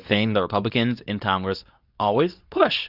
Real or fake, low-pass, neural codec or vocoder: fake; 5.4 kHz; codec, 24 kHz, 0.9 kbps, WavTokenizer, medium speech release version 2